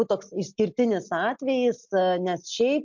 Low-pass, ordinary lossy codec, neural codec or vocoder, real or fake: 7.2 kHz; MP3, 48 kbps; none; real